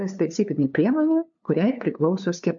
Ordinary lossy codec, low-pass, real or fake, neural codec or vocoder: AAC, 64 kbps; 7.2 kHz; fake; codec, 16 kHz, 2 kbps, FunCodec, trained on LibriTTS, 25 frames a second